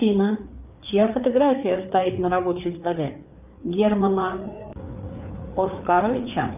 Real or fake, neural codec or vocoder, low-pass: fake; codec, 16 kHz, 4 kbps, FreqCodec, larger model; 3.6 kHz